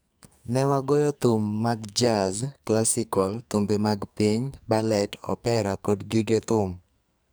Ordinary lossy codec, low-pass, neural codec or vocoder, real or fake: none; none; codec, 44.1 kHz, 2.6 kbps, SNAC; fake